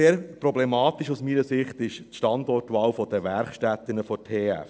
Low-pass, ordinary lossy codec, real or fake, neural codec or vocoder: none; none; real; none